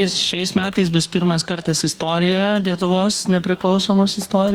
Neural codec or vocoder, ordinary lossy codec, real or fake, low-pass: codec, 44.1 kHz, 2.6 kbps, DAC; Opus, 64 kbps; fake; 19.8 kHz